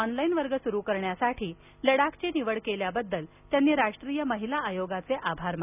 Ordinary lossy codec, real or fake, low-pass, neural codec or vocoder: none; real; 3.6 kHz; none